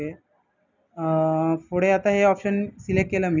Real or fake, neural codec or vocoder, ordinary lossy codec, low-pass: real; none; none; 7.2 kHz